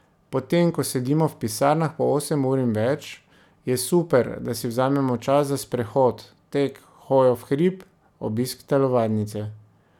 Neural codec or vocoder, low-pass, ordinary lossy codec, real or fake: none; 19.8 kHz; none; real